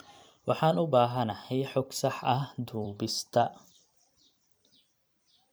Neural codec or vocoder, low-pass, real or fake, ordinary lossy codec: vocoder, 44.1 kHz, 128 mel bands every 256 samples, BigVGAN v2; none; fake; none